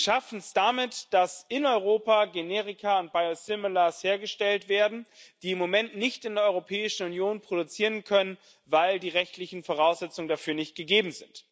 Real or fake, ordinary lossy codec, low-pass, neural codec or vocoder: real; none; none; none